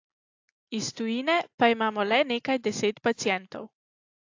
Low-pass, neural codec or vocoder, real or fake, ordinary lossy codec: 7.2 kHz; none; real; AAC, 48 kbps